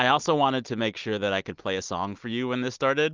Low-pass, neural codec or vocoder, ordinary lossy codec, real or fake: 7.2 kHz; none; Opus, 32 kbps; real